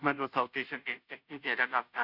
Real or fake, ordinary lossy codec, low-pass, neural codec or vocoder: fake; none; 5.4 kHz; codec, 16 kHz, 0.5 kbps, FunCodec, trained on Chinese and English, 25 frames a second